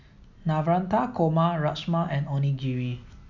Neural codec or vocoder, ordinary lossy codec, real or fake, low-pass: none; none; real; 7.2 kHz